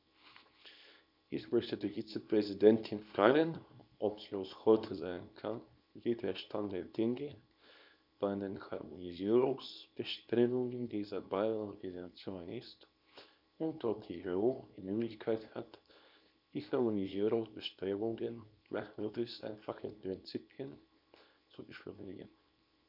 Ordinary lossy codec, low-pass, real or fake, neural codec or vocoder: none; 5.4 kHz; fake; codec, 24 kHz, 0.9 kbps, WavTokenizer, small release